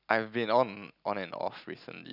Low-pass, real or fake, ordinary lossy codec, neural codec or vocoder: 5.4 kHz; real; none; none